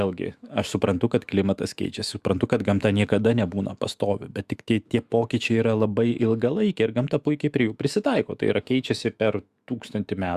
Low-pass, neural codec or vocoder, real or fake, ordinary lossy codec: 14.4 kHz; autoencoder, 48 kHz, 128 numbers a frame, DAC-VAE, trained on Japanese speech; fake; Opus, 64 kbps